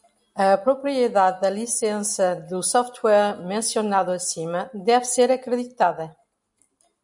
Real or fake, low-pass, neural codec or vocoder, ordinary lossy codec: real; 10.8 kHz; none; MP3, 96 kbps